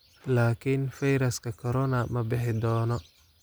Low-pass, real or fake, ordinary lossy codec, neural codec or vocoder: none; real; none; none